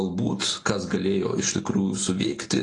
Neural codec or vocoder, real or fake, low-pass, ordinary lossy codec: none; real; 10.8 kHz; AAC, 32 kbps